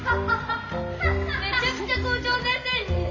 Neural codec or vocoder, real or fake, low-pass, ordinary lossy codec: none; real; 7.2 kHz; none